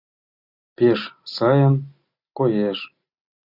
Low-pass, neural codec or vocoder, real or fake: 5.4 kHz; none; real